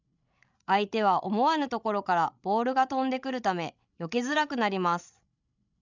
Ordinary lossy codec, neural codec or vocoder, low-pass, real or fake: none; codec, 16 kHz, 16 kbps, FreqCodec, larger model; 7.2 kHz; fake